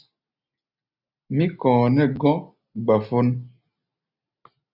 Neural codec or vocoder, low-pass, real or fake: none; 5.4 kHz; real